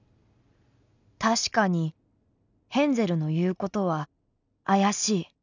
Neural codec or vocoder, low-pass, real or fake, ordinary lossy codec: none; 7.2 kHz; real; none